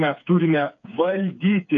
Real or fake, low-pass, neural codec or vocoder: fake; 7.2 kHz; codec, 16 kHz, 4 kbps, FreqCodec, smaller model